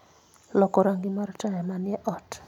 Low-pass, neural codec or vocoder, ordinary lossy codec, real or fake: 19.8 kHz; none; none; real